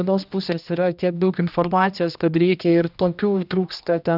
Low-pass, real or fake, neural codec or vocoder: 5.4 kHz; fake; codec, 16 kHz, 1 kbps, X-Codec, HuBERT features, trained on general audio